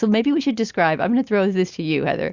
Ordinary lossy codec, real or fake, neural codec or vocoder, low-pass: Opus, 64 kbps; real; none; 7.2 kHz